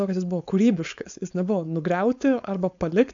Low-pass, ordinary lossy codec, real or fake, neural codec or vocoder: 7.2 kHz; AAC, 64 kbps; fake; codec, 16 kHz, 4.8 kbps, FACodec